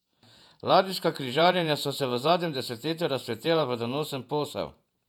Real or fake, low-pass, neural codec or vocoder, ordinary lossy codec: fake; 19.8 kHz; vocoder, 48 kHz, 128 mel bands, Vocos; none